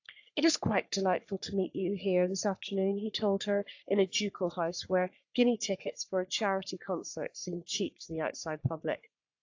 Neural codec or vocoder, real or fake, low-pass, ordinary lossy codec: codec, 44.1 kHz, 3.4 kbps, Pupu-Codec; fake; 7.2 kHz; AAC, 48 kbps